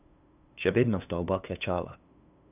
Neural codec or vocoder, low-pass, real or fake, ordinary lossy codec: codec, 16 kHz, 2 kbps, FunCodec, trained on LibriTTS, 25 frames a second; 3.6 kHz; fake; none